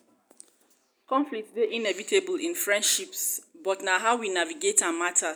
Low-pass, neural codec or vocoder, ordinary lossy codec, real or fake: none; none; none; real